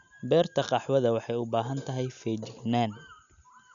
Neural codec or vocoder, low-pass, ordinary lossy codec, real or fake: none; 7.2 kHz; none; real